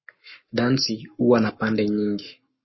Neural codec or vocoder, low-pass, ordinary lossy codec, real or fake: codec, 44.1 kHz, 7.8 kbps, Pupu-Codec; 7.2 kHz; MP3, 24 kbps; fake